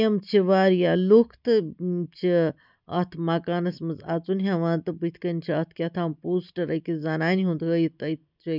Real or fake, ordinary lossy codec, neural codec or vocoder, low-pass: real; none; none; 5.4 kHz